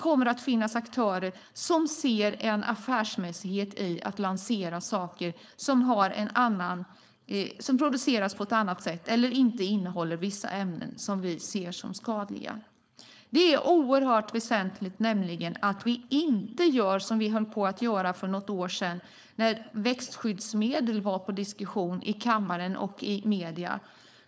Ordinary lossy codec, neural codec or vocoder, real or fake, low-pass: none; codec, 16 kHz, 4.8 kbps, FACodec; fake; none